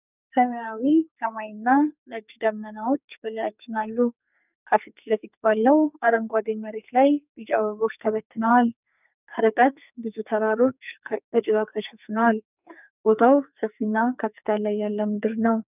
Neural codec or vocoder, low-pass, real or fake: codec, 44.1 kHz, 2.6 kbps, SNAC; 3.6 kHz; fake